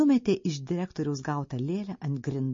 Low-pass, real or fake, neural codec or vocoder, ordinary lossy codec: 7.2 kHz; real; none; MP3, 32 kbps